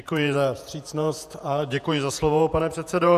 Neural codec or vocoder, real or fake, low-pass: vocoder, 48 kHz, 128 mel bands, Vocos; fake; 14.4 kHz